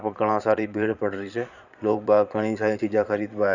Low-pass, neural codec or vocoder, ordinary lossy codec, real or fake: 7.2 kHz; vocoder, 22.05 kHz, 80 mel bands, Vocos; none; fake